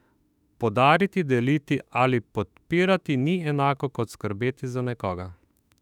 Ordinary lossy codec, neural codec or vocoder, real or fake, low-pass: none; autoencoder, 48 kHz, 32 numbers a frame, DAC-VAE, trained on Japanese speech; fake; 19.8 kHz